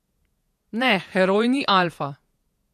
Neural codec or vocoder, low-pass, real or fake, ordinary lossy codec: vocoder, 48 kHz, 128 mel bands, Vocos; 14.4 kHz; fake; MP3, 96 kbps